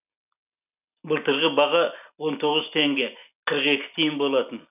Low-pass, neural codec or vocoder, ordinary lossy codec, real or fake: 3.6 kHz; none; none; real